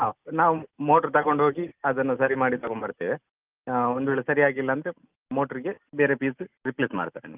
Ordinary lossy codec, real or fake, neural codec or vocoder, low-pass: Opus, 24 kbps; real; none; 3.6 kHz